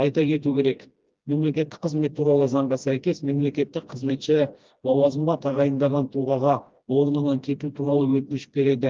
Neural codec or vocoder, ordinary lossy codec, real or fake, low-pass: codec, 16 kHz, 1 kbps, FreqCodec, smaller model; Opus, 32 kbps; fake; 7.2 kHz